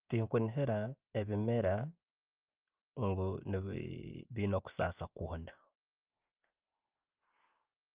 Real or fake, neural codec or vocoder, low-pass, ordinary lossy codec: real; none; 3.6 kHz; Opus, 32 kbps